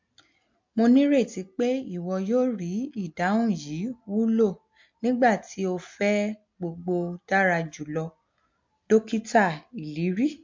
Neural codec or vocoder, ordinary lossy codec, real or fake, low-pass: none; MP3, 48 kbps; real; 7.2 kHz